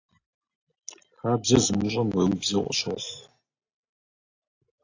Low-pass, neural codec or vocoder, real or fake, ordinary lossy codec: 7.2 kHz; none; real; AAC, 48 kbps